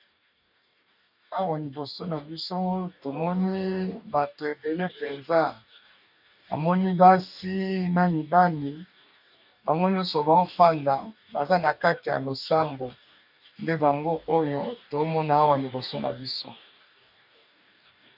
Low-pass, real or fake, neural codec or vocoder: 5.4 kHz; fake; codec, 44.1 kHz, 2.6 kbps, DAC